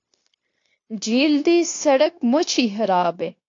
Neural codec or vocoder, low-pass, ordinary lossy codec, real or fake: codec, 16 kHz, 0.9 kbps, LongCat-Audio-Codec; 7.2 kHz; AAC, 48 kbps; fake